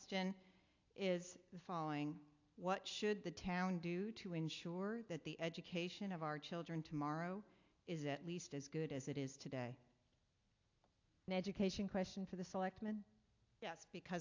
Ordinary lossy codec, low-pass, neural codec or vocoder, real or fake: AAC, 48 kbps; 7.2 kHz; none; real